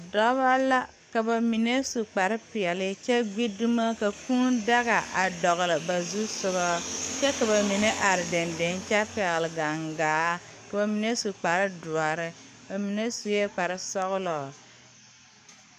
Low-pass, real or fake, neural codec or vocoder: 14.4 kHz; fake; codec, 44.1 kHz, 7.8 kbps, DAC